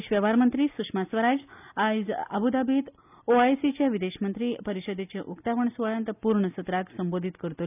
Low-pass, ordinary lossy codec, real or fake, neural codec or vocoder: 3.6 kHz; none; real; none